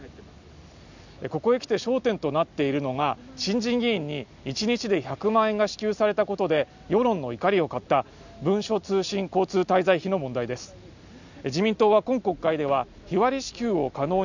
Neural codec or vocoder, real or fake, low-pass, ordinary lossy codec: none; real; 7.2 kHz; none